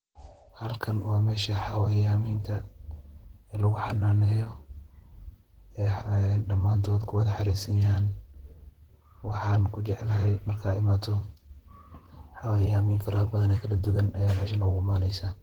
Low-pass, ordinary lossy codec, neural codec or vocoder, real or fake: 19.8 kHz; Opus, 16 kbps; vocoder, 44.1 kHz, 128 mel bands, Pupu-Vocoder; fake